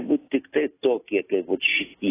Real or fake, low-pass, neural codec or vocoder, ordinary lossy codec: real; 3.6 kHz; none; AAC, 16 kbps